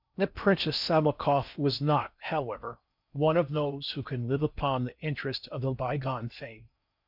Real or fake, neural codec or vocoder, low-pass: fake; codec, 16 kHz in and 24 kHz out, 0.6 kbps, FocalCodec, streaming, 4096 codes; 5.4 kHz